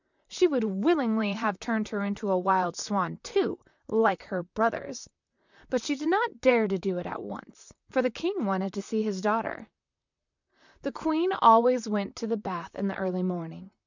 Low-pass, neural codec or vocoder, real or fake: 7.2 kHz; vocoder, 44.1 kHz, 128 mel bands, Pupu-Vocoder; fake